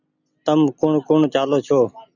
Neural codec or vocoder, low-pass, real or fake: none; 7.2 kHz; real